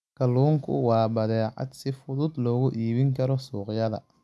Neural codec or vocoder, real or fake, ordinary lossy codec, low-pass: none; real; none; none